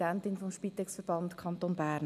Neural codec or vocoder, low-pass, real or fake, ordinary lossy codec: none; 14.4 kHz; real; none